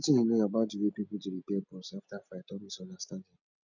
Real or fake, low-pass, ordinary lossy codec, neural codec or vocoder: real; 7.2 kHz; none; none